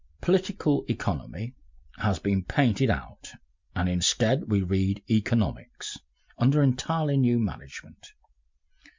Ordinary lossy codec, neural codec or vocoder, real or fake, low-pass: MP3, 64 kbps; none; real; 7.2 kHz